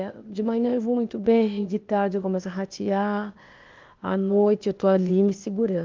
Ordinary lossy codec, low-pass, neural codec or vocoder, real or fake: Opus, 24 kbps; 7.2 kHz; codec, 16 kHz, 0.8 kbps, ZipCodec; fake